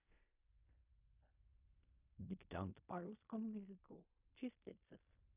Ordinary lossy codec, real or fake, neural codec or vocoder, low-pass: none; fake; codec, 16 kHz in and 24 kHz out, 0.4 kbps, LongCat-Audio-Codec, fine tuned four codebook decoder; 3.6 kHz